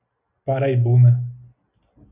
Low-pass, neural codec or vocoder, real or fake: 3.6 kHz; none; real